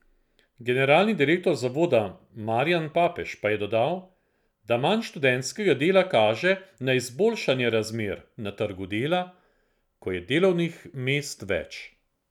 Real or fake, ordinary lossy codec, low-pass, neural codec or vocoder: real; none; 19.8 kHz; none